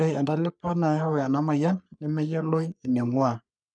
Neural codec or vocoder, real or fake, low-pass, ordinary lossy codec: codec, 44.1 kHz, 3.4 kbps, Pupu-Codec; fake; 9.9 kHz; none